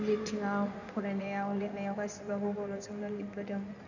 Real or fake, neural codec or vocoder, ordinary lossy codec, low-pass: fake; codec, 16 kHz in and 24 kHz out, 2.2 kbps, FireRedTTS-2 codec; none; 7.2 kHz